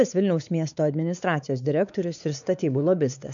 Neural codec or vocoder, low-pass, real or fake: codec, 16 kHz, 4 kbps, X-Codec, WavLM features, trained on Multilingual LibriSpeech; 7.2 kHz; fake